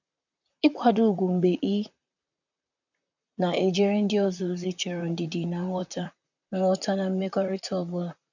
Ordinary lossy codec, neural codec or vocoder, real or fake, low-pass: none; vocoder, 44.1 kHz, 128 mel bands, Pupu-Vocoder; fake; 7.2 kHz